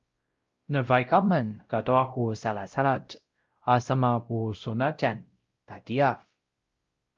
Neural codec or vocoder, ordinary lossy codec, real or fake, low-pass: codec, 16 kHz, 0.5 kbps, X-Codec, WavLM features, trained on Multilingual LibriSpeech; Opus, 32 kbps; fake; 7.2 kHz